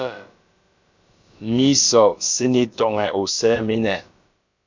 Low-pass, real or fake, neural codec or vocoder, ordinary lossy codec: 7.2 kHz; fake; codec, 16 kHz, about 1 kbps, DyCAST, with the encoder's durations; MP3, 64 kbps